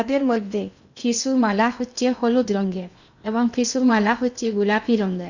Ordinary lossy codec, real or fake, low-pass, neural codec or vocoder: none; fake; 7.2 kHz; codec, 16 kHz in and 24 kHz out, 0.6 kbps, FocalCodec, streaming, 2048 codes